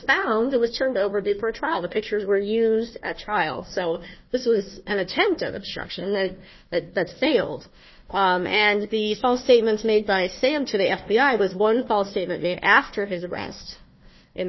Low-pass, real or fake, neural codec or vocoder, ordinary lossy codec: 7.2 kHz; fake; codec, 16 kHz, 1 kbps, FunCodec, trained on Chinese and English, 50 frames a second; MP3, 24 kbps